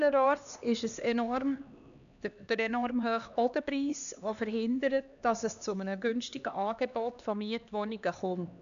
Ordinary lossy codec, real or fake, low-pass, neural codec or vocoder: none; fake; 7.2 kHz; codec, 16 kHz, 2 kbps, X-Codec, HuBERT features, trained on LibriSpeech